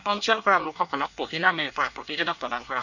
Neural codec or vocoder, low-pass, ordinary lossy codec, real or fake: codec, 24 kHz, 1 kbps, SNAC; 7.2 kHz; none; fake